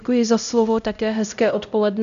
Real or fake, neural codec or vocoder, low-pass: fake; codec, 16 kHz, 0.5 kbps, X-Codec, HuBERT features, trained on LibriSpeech; 7.2 kHz